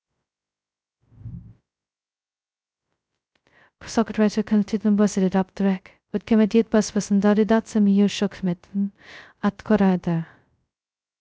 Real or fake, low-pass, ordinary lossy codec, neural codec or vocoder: fake; none; none; codec, 16 kHz, 0.2 kbps, FocalCodec